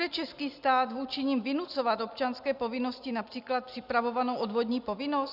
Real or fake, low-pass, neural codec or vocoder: real; 5.4 kHz; none